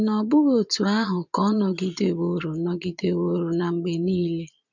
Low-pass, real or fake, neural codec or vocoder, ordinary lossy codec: 7.2 kHz; fake; vocoder, 22.05 kHz, 80 mel bands, Vocos; none